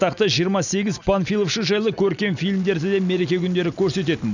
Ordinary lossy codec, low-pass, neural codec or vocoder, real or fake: none; 7.2 kHz; none; real